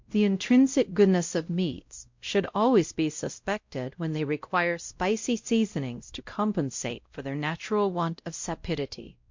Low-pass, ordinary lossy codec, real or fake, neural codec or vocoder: 7.2 kHz; MP3, 48 kbps; fake; codec, 16 kHz, 0.5 kbps, X-Codec, WavLM features, trained on Multilingual LibriSpeech